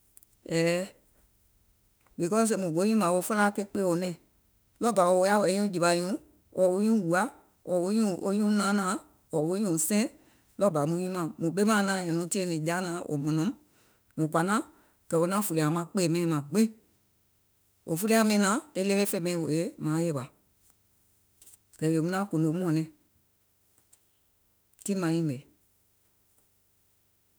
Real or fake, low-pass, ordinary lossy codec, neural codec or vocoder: fake; none; none; autoencoder, 48 kHz, 32 numbers a frame, DAC-VAE, trained on Japanese speech